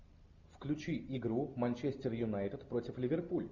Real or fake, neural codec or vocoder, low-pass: real; none; 7.2 kHz